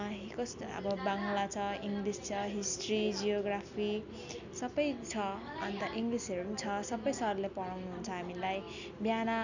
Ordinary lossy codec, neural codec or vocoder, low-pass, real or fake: none; none; 7.2 kHz; real